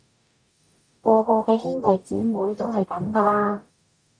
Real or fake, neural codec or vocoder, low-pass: fake; codec, 44.1 kHz, 0.9 kbps, DAC; 9.9 kHz